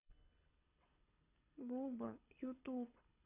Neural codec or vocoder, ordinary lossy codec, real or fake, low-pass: codec, 24 kHz, 6 kbps, HILCodec; none; fake; 3.6 kHz